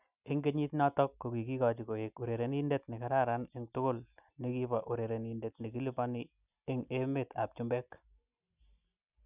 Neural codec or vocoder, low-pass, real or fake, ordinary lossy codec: none; 3.6 kHz; real; none